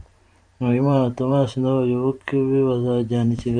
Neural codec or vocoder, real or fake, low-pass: vocoder, 44.1 kHz, 128 mel bands every 512 samples, BigVGAN v2; fake; 9.9 kHz